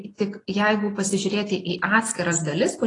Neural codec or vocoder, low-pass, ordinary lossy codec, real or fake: none; 10.8 kHz; AAC, 32 kbps; real